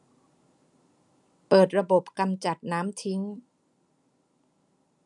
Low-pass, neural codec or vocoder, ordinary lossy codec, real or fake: 10.8 kHz; none; none; real